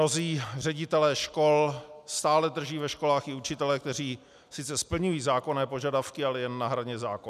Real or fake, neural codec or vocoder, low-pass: real; none; 14.4 kHz